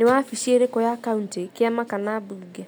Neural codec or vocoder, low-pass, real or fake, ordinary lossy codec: none; none; real; none